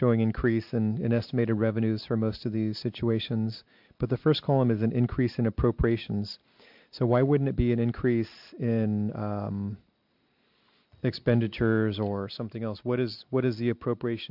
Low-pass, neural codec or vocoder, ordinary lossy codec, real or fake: 5.4 kHz; none; MP3, 48 kbps; real